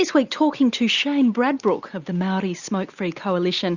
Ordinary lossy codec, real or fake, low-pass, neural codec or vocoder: Opus, 64 kbps; real; 7.2 kHz; none